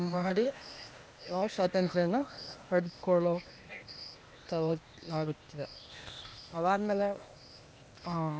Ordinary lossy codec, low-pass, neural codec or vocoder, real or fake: none; none; codec, 16 kHz, 0.8 kbps, ZipCodec; fake